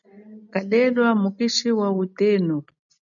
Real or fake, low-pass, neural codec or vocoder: real; 7.2 kHz; none